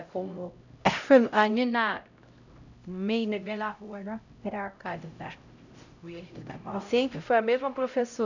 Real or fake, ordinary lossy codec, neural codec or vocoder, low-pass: fake; none; codec, 16 kHz, 0.5 kbps, X-Codec, HuBERT features, trained on LibriSpeech; 7.2 kHz